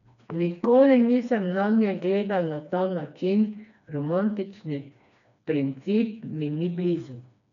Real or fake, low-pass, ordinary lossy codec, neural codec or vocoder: fake; 7.2 kHz; none; codec, 16 kHz, 2 kbps, FreqCodec, smaller model